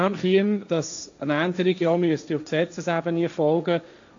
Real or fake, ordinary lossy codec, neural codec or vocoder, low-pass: fake; AAC, 64 kbps; codec, 16 kHz, 1.1 kbps, Voila-Tokenizer; 7.2 kHz